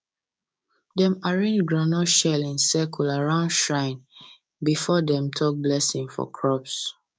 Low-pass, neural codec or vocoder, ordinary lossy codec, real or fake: none; codec, 16 kHz, 6 kbps, DAC; none; fake